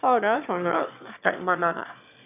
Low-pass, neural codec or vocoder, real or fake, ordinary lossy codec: 3.6 kHz; autoencoder, 22.05 kHz, a latent of 192 numbers a frame, VITS, trained on one speaker; fake; none